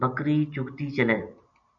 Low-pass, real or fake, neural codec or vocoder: 7.2 kHz; real; none